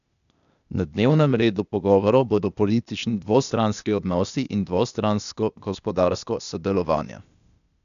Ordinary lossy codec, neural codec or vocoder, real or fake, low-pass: none; codec, 16 kHz, 0.8 kbps, ZipCodec; fake; 7.2 kHz